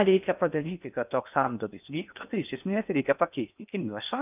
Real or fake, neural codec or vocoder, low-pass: fake; codec, 16 kHz in and 24 kHz out, 0.6 kbps, FocalCodec, streaming, 2048 codes; 3.6 kHz